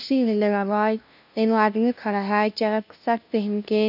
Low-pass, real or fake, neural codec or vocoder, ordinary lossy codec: 5.4 kHz; fake; codec, 16 kHz, 0.5 kbps, FunCodec, trained on LibriTTS, 25 frames a second; none